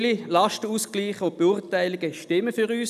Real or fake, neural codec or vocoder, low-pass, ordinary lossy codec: real; none; 14.4 kHz; none